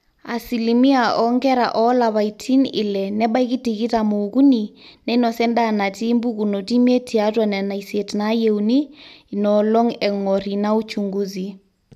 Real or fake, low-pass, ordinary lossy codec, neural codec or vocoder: real; 14.4 kHz; none; none